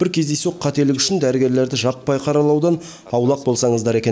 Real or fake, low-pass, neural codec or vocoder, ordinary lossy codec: real; none; none; none